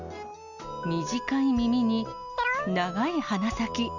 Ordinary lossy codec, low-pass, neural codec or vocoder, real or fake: none; 7.2 kHz; none; real